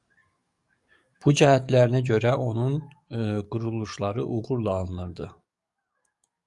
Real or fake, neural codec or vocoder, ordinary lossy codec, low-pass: fake; codec, 44.1 kHz, 7.8 kbps, DAC; Opus, 64 kbps; 10.8 kHz